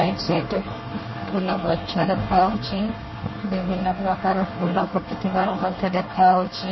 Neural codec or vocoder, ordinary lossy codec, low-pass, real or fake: codec, 24 kHz, 1 kbps, SNAC; MP3, 24 kbps; 7.2 kHz; fake